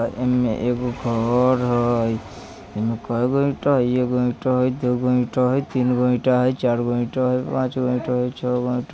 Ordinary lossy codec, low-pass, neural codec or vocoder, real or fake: none; none; none; real